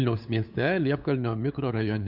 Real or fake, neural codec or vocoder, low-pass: fake; codec, 16 kHz, 8 kbps, FunCodec, trained on LibriTTS, 25 frames a second; 5.4 kHz